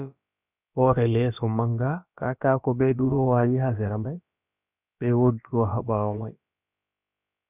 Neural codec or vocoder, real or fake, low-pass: codec, 16 kHz, about 1 kbps, DyCAST, with the encoder's durations; fake; 3.6 kHz